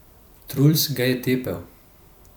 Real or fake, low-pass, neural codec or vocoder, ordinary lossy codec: fake; none; vocoder, 44.1 kHz, 128 mel bands every 256 samples, BigVGAN v2; none